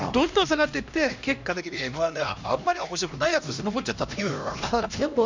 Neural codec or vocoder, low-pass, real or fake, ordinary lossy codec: codec, 16 kHz, 1 kbps, X-Codec, HuBERT features, trained on LibriSpeech; 7.2 kHz; fake; MP3, 64 kbps